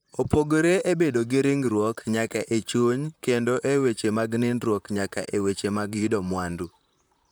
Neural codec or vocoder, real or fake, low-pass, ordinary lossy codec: vocoder, 44.1 kHz, 128 mel bands, Pupu-Vocoder; fake; none; none